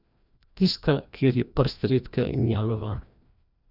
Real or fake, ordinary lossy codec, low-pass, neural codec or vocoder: fake; none; 5.4 kHz; codec, 16 kHz, 1 kbps, FreqCodec, larger model